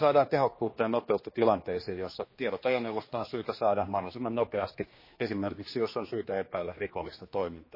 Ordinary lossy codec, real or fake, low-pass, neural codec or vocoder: MP3, 24 kbps; fake; 5.4 kHz; codec, 16 kHz, 2 kbps, X-Codec, HuBERT features, trained on general audio